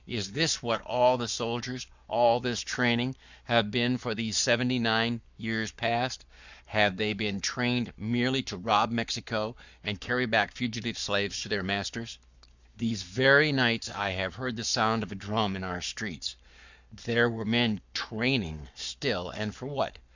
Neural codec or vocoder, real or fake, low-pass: codec, 44.1 kHz, 7.8 kbps, Pupu-Codec; fake; 7.2 kHz